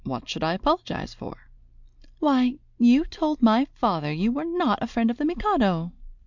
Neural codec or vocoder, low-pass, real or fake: none; 7.2 kHz; real